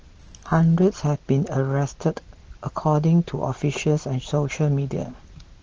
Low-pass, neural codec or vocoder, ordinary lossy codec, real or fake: 7.2 kHz; none; Opus, 16 kbps; real